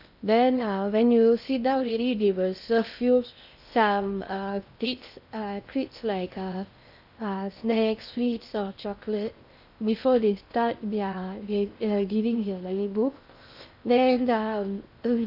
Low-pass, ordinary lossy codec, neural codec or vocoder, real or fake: 5.4 kHz; none; codec, 16 kHz in and 24 kHz out, 0.6 kbps, FocalCodec, streaming, 2048 codes; fake